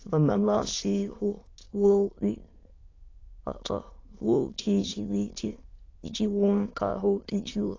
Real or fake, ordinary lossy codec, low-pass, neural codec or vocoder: fake; AAC, 32 kbps; 7.2 kHz; autoencoder, 22.05 kHz, a latent of 192 numbers a frame, VITS, trained on many speakers